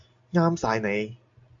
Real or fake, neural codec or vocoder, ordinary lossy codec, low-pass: real; none; AAC, 64 kbps; 7.2 kHz